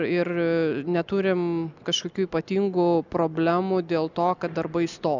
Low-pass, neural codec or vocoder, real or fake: 7.2 kHz; none; real